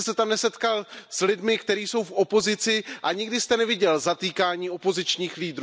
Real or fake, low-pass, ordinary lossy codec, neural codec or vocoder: real; none; none; none